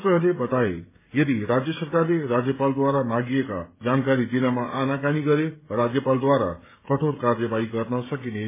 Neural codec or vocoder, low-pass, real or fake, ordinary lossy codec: codec, 16 kHz, 16 kbps, FreqCodec, smaller model; 3.6 kHz; fake; MP3, 16 kbps